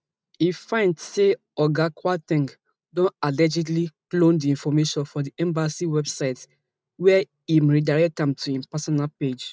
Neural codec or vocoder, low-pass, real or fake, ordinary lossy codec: none; none; real; none